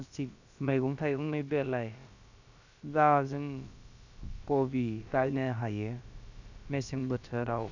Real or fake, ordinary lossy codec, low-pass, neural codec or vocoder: fake; none; 7.2 kHz; codec, 16 kHz, about 1 kbps, DyCAST, with the encoder's durations